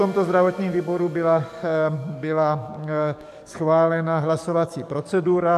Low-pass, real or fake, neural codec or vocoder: 14.4 kHz; fake; codec, 44.1 kHz, 7.8 kbps, DAC